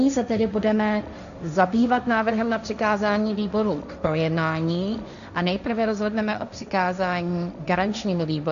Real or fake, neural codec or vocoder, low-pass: fake; codec, 16 kHz, 1.1 kbps, Voila-Tokenizer; 7.2 kHz